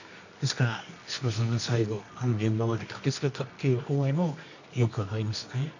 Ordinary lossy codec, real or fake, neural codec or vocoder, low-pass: none; fake; codec, 24 kHz, 0.9 kbps, WavTokenizer, medium music audio release; 7.2 kHz